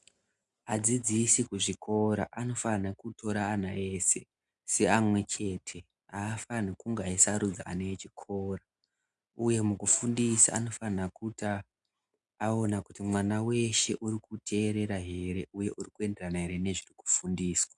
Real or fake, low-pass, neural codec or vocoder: real; 10.8 kHz; none